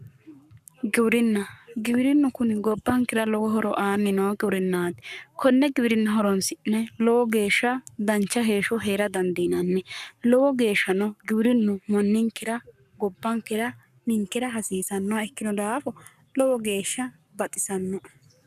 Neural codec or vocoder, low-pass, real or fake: codec, 44.1 kHz, 7.8 kbps, Pupu-Codec; 14.4 kHz; fake